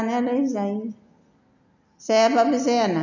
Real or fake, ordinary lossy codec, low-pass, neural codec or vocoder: real; none; 7.2 kHz; none